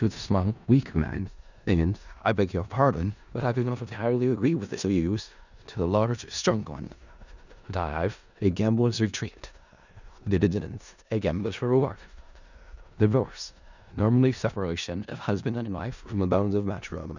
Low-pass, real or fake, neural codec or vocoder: 7.2 kHz; fake; codec, 16 kHz in and 24 kHz out, 0.4 kbps, LongCat-Audio-Codec, four codebook decoder